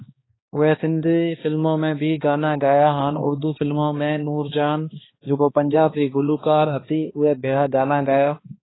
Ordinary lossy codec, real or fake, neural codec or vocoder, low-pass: AAC, 16 kbps; fake; codec, 16 kHz, 2 kbps, X-Codec, HuBERT features, trained on balanced general audio; 7.2 kHz